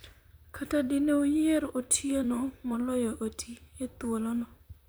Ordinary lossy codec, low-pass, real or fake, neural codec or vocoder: none; none; fake; vocoder, 44.1 kHz, 128 mel bands, Pupu-Vocoder